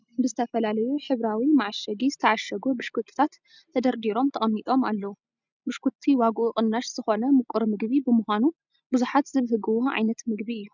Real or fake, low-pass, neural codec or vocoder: real; 7.2 kHz; none